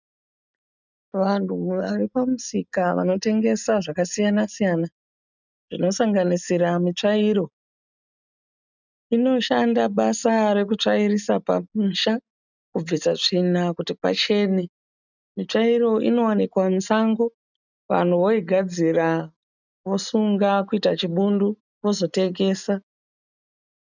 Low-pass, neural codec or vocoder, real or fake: 7.2 kHz; none; real